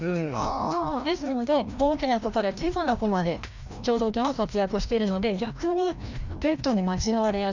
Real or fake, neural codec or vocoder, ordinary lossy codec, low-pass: fake; codec, 16 kHz, 1 kbps, FreqCodec, larger model; none; 7.2 kHz